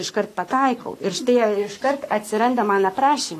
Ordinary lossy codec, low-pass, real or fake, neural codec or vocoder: AAC, 48 kbps; 14.4 kHz; fake; codec, 44.1 kHz, 7.8 kbps, Pupu-Codec